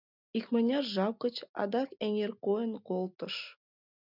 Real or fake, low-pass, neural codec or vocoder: real; 5.4 kHz; none